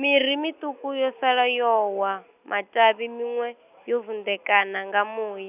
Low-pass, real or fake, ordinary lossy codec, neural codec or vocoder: 3.6 kHz; real; none; none